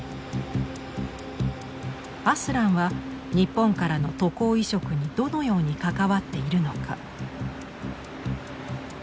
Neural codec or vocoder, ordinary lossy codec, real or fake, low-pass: none; none; real; none